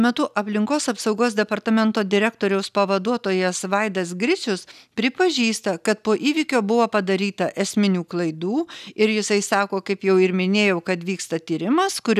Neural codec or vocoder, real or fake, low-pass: none; real; 14.4 kHz